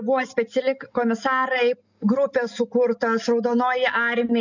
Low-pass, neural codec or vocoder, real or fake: 7.2 kHz; none; real